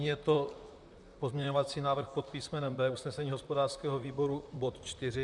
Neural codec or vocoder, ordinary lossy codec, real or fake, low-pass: vocoder, 44.1 kHz, 128 mel bands, Pupu-Vocoder; MP3, 96 kbps; fake; 10.8 kHz